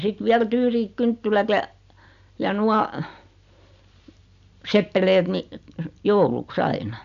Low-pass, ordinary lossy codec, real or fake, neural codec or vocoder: 7.2 kHz; none; real; none